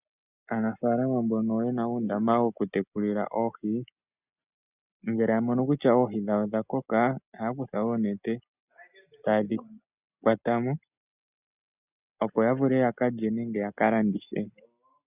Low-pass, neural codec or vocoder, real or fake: 3.6 kHz; none; real